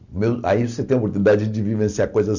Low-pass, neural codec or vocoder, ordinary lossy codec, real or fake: 7.2 kHz; none; none; real